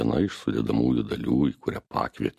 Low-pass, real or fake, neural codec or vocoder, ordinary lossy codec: 14.4 kHz; real; none; MP3, 64 kbps